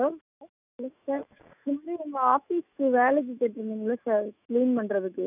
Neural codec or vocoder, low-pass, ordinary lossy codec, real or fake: autoencoder, 48 kHz, 128 numbers a frame, DAC-VAE, trained on Japanese speech; 3.6 kHz; none; fake